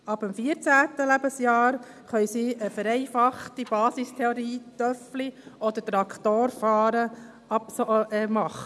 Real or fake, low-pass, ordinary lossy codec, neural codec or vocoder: real; none; none; none